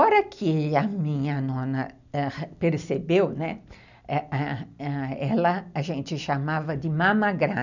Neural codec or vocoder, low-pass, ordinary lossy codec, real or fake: none; 7.2 kHz; none; real